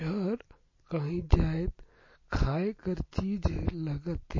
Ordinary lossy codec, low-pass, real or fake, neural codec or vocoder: MP3, 32 kbps; 7.2 kHz; real; none